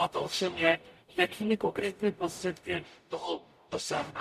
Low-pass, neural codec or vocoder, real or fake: 14.4 kHz; codec, 44.1 kHz, 0.9 kbps, DAC; fake